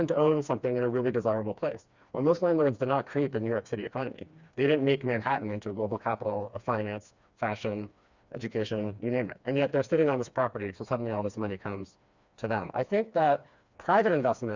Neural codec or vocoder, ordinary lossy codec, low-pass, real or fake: codec, 16 kHz, 2 kbps, FreqCodec, smaller model; Opus, 64 kbps; 7.2 kHz; fake